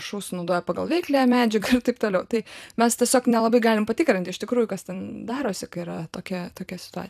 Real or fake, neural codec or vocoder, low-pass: fake; vocoder, 44.1 kHz, 128 mel bands every 256 samples, BigVGAN v2; 14.4 kHz